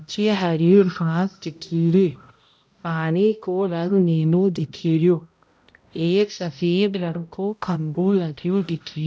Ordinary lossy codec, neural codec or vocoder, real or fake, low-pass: none; codec, 16 kHz, 0.5 kbps, X-Codec, HuBERT features, trained on balanced general audio; fake; none